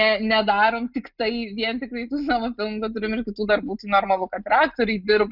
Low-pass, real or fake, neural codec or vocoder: 5.4 kHz; real; none